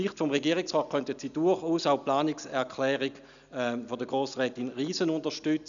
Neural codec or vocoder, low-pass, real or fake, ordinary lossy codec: none; 7.2 kHz; real; none